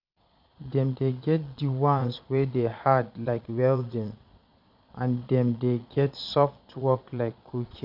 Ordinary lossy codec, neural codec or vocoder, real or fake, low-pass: none; vocoder, 22.05 kHz, 80 mel bands, Vocos; fake; 5.4 kHz